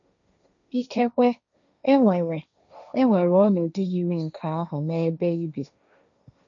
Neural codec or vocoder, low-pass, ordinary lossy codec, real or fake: codec, 16 kHz, 1.1 kbps, Voila-Tokenizer; 7.2 kHz; none; fake